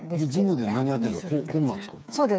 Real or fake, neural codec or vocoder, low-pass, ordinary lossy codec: fake; codec, 16 kHz, 4 kbps, FreqCodec, smaller model; none; none